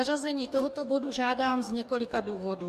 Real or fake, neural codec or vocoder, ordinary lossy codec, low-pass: fake; codec, 44.1 kHz, 2.6 kbps, DAC; AAC, 96 kbps; 14.4 kHz